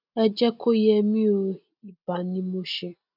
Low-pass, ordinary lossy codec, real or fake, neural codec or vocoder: 5.4 kHz; none; real; none